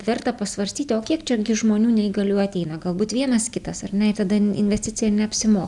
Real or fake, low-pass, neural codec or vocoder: real; 10.8 kHz; none